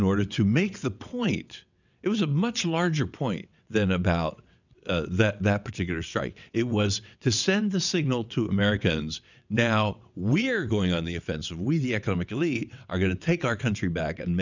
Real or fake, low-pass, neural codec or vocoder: fake; 7.2 kHz; vocoder, 22.05 kHz, 80 mel bands, WaveNeXt